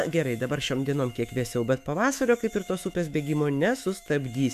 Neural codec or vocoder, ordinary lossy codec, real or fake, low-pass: autoencoder, 48 kHz, 128 numbers a frame, DAC-VAE, trained on Japanese speech; MP3, 96 kbps; fake; 14.4 kHz